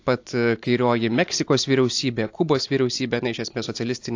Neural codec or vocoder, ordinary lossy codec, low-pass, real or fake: none; AAC, 48 kbps; 7.2 kHz; real